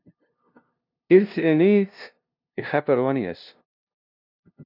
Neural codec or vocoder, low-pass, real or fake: codec, 16 kHz, 0.5 kbps, FunCodec, trained on LibriTTS, 25 frames a second; 5.4 kHz; fake